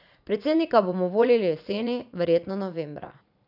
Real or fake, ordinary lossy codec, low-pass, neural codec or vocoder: fake; none; 5.4 kHz; vocoder, 44.1 kHz, 80 mel bands, Vocos